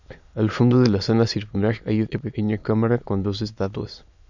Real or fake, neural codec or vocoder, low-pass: fake; autoencoder, 22.05 kHz, a latent of 192 numbers a frame, VITS, trained on many speakers; 7.2 kHz